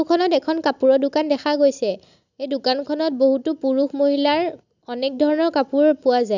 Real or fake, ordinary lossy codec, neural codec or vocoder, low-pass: real; none; none; 7.2 kHz